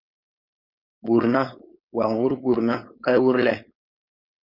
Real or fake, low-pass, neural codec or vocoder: fake; 5.4 kHz; codec, 16 kHz, 4.8 kbps, FACodec